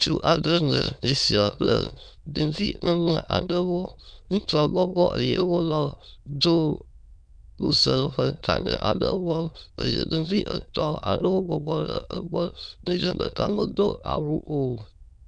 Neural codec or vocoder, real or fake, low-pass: autoencoder, 22.05 kHz, a latent of 192 numbers a frame, VITS, trained on many speakers; fake; 9.9 kHz